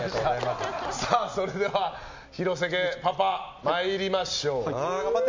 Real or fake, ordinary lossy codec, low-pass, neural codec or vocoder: real; MP3, 64 kbps; 7.2 kHz; none